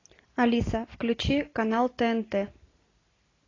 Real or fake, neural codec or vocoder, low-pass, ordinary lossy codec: real; none; 7.2 kHz; AAC, 32 kbps